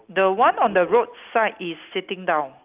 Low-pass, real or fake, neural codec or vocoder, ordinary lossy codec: 3.6 kHz; real; none; Opus, 32 kbps